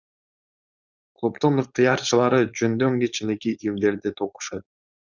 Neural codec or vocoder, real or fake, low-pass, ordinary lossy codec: codec, 16 kHz, 4.8 kbps, FACodec; fake; 7.2 kHz; Opus, 64 kbps